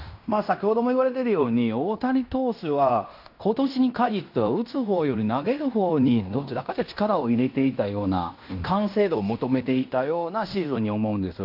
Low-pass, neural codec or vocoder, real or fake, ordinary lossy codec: 5.4 kHz; codec, 16 kHz in and 24 kHz out, 0.9 kbps, LongCat-Audio-Codec, fine tuned four codebook decoder; fake; none